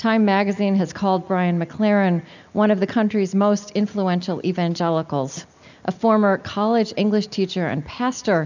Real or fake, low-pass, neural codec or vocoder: real; 7.2 kHz; none